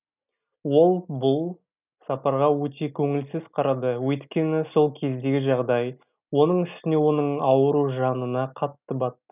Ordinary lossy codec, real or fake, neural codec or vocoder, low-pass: none; real; none; 3.6 kHz